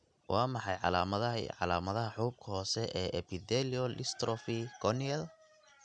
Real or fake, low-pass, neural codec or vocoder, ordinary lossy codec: real; 9.9 kHz; none; none